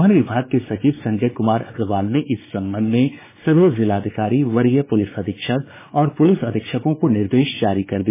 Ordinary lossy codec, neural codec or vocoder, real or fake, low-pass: MP3, 16 kbps; codec, 16 kHz, 4 kbps, X-Codec, WavLM features, trained on Multilingual LibriSpeech; fake; 3.6 kHz